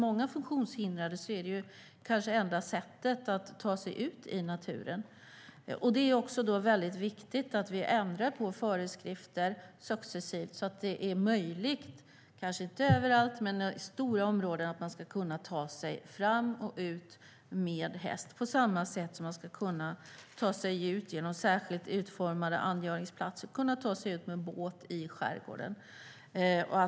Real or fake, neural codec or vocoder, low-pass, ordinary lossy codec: real; none; none; none